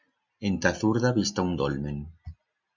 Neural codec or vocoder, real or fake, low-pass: none; real; 7.2 kHz